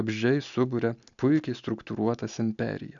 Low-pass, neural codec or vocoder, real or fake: 7.2 kHz; none; real